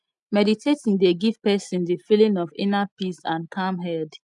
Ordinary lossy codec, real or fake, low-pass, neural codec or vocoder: none; real; 10.8 kHz; none